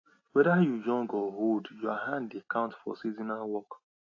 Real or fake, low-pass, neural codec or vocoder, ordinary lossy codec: real; 7.2 kHz; none; AAC, 32 kbps